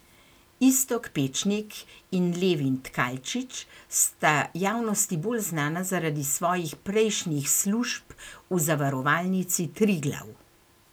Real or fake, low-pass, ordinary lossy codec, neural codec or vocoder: real; none; none; none